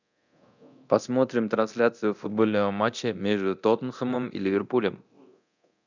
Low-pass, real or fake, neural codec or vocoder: 7.2 kHz; fake; codec, 24 kHz, 0.9 kbps, DualCodec